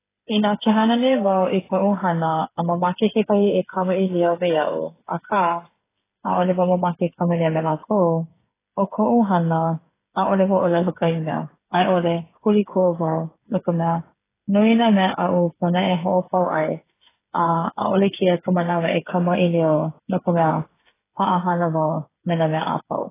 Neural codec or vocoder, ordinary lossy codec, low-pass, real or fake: codec, 16 kHz, 8 kbps, FreqCodec, smaller model; AAC, 16 kbps; 3.6 kHz; fake